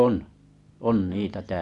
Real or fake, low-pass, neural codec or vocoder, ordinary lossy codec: real; 10.8 kHz; none; none